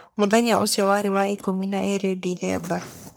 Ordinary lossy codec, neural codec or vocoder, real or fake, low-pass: none; codec, 44.1 kHz, 1.7 kbps, Pupu-Codec; fake; none